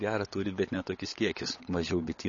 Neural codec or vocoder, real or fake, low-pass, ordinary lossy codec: codec, 16 kHz, 16 kbps, FunCodec, trained on LibriTTS, 50 frames a second; fake; 7.2 kHz; MP3, 32 kbps